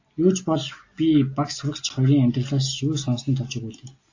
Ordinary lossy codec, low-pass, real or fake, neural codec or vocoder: AAC, 48 kbps; 7.2 kHz; real; none